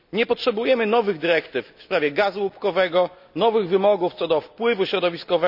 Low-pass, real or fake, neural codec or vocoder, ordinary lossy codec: 5.4 kHz; real; none; none